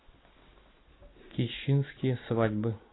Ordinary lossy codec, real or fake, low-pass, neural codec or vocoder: AAC, 16 kbps; real; 7.2 kHz; none